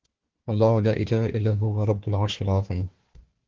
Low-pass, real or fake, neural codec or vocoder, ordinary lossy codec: 7.2 kHz; fake; codec, 16 kHz, 1 kbps, FunCodec, trained on Chinese and English, 50 frames a second; Opus, 16 kbps